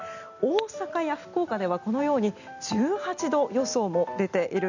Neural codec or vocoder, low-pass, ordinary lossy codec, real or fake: none; 7.2 kHz; none; real